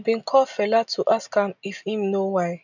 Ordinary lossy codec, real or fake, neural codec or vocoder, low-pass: none; real; none; 7.2 kHz